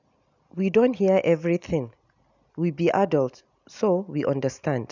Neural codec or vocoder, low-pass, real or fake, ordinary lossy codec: none; 7.2 kHz; real; none